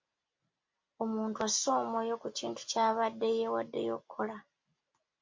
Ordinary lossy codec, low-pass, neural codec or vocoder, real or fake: MP3, 48 kbps; 7.2 kHz; none; real